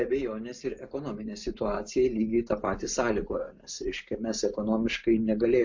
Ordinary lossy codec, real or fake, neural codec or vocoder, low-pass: MP3, 48 kbps; real; none; 7.2 kHz